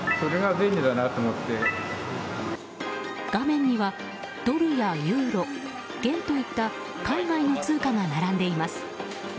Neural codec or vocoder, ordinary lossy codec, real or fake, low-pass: none; none; real; none